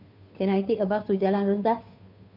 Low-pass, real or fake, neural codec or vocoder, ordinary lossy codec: 5.4 kHz; fake; codec, 16 kHz, 2 kbps, FunCodec, trained on Chinese and English, 25 frames a second; none